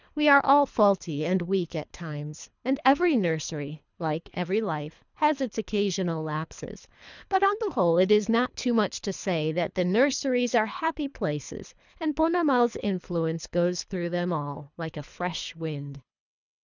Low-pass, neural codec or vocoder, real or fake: 7.2 kHz; codec, 24 kHz, 3 kbps, HILCodec; fake